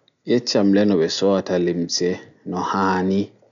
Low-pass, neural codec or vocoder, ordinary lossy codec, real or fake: 7.2 kHz; none; none; real